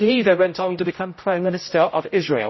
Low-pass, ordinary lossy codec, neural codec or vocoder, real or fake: 7.2 kHz; MP3, 24 kbps; codec, 16 kHz, 0.5 kbps, X-Codec, HuBERT features, trained on general audio; fake